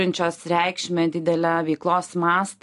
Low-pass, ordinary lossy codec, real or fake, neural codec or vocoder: 10.8 kHz; AAC, 64 kbps; real; none